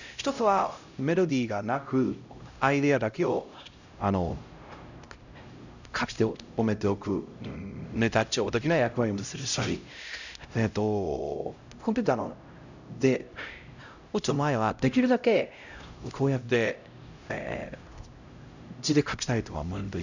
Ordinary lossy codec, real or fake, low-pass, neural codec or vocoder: none; fake; 7.2 kHz; codec, 16 kHz, 0.5 kbps, X-Codec, HuBERT features, trained on LibriSpeech